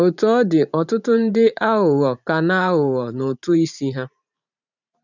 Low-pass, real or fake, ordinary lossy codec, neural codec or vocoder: 7.2 kHz; fake; none; vocoder, 44.1 kHz, 128 mel bands every 512 samples, BigVGAN v2